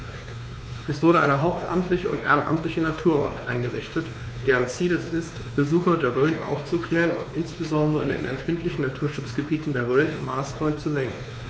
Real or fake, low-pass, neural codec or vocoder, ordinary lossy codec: fake; none; codec, 16 kHz, 2 kbps, X-Codec, WavLM features, trained on Multilingual LibriSpeech; none